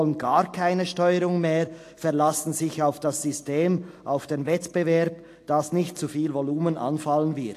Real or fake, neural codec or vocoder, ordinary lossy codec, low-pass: real; none; AAC, 64 kbps; 14.4 kHz